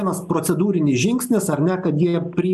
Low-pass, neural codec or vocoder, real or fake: 14.4 kHz; none; real